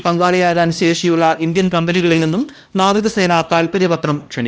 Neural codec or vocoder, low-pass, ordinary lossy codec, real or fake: codec, 16 kHz, 1 kbps, X-Codec, HuBERT features, trained on LibriSpeech; none; none; fake